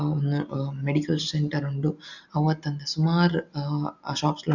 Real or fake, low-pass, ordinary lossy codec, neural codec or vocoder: real; 7.2 kHz; none; none